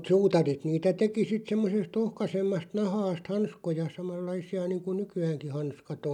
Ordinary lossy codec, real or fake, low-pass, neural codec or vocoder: none; real; 19.8 kHz; none